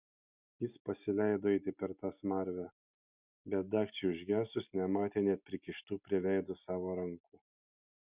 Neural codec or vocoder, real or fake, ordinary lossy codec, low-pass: none; real; Opus, 64 kbps; 3.6 kHz